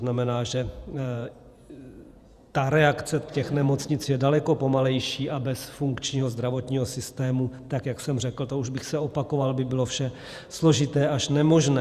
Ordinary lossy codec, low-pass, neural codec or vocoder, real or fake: Opus, 64 kbps; 14.4 kHz; vocoder, 48 kHz, 128 mel bands, Vocos; fake